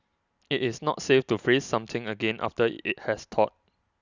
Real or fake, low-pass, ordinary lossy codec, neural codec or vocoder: real; 7.2 kHz; none; none